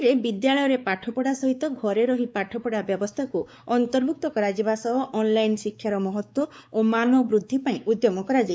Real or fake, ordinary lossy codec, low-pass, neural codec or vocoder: fake; none; none; codec, 16 kHz, 4 kbps, X-Codec, WavLM features, trained on Multilingual LibriSpeech